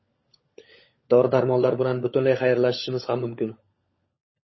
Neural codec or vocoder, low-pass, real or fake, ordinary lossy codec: codec, 16 kHz, 16 kbps, FunCodec, trained on LibriTTS, 50 frames a second; 7.2 kHz; fake; MP3, 24 kbps